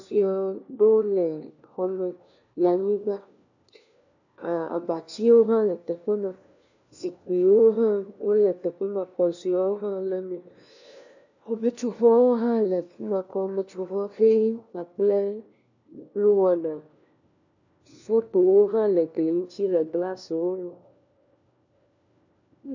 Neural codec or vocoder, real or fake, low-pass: codec, 16 kHz, 1 kbps, FunCodec, trained on LibriTTS, 50 frames a second; fake; 7.2 kHz